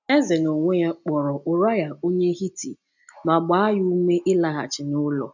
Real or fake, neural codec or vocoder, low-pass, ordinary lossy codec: real; none; 7.2 kHz; none